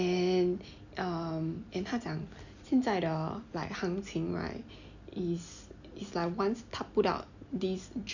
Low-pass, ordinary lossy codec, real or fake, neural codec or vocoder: 7.2 kHz; none; real; none